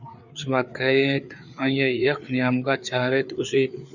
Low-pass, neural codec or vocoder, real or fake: 7.2 kHz; codec, 16 kHz, 4 kbps, FreqCodec, larger model; fake